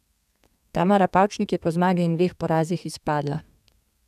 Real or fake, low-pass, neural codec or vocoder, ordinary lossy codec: fake; 14.4 kHz; codec, 32 kHz, 1.9 kbps, SNAC; none